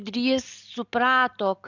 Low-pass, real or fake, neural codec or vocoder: 7.2 kHz; real; none